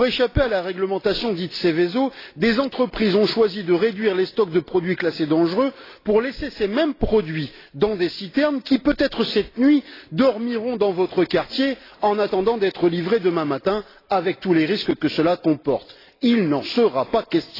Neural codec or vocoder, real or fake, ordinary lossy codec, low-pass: none; real; AAC, 24 kbps; 5.4 kHz